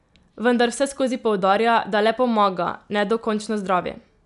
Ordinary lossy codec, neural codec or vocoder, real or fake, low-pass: none; none; real; 10.8 kHz